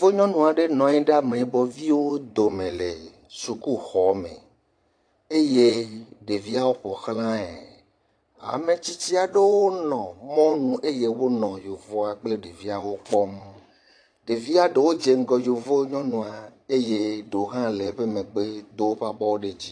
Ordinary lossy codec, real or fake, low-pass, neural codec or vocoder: AAC, 48 kbps; fake; 9.9 kHz; vocoder, 22.05 kHz, 80 mel bands, Vocos